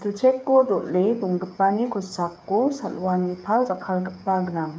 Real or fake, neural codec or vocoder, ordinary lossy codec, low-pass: fake; codec, 16 kHz, 8 kbps, FreqCodec, smaller model; none; none